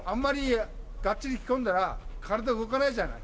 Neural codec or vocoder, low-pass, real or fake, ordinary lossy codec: none; none; real; none